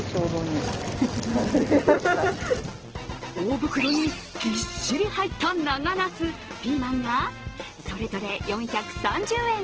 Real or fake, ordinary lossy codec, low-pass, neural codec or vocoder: real; Opus, 16 kbps; 7.2 kHz; none